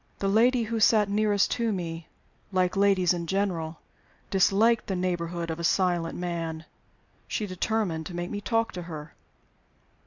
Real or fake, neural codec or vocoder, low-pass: real; none; 7.2 kHz